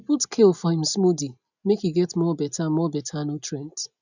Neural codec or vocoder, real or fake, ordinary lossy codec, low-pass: none; real; none; 7.2 kHz